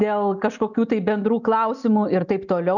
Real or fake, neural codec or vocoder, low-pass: real; none; 7.2 kHz